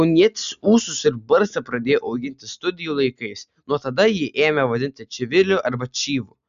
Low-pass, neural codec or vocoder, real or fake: 7.2 kHz; none; real